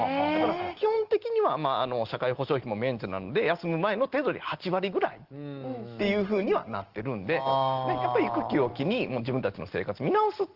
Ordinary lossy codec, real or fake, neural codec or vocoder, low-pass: Opus, 16 kbps; real; none; 5.4 kHz